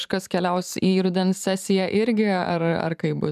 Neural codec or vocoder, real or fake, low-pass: vocoder, 44.1 kHz, 128 mel bands every 512 samples, BigVGAN v2; fake; 14.4 kHz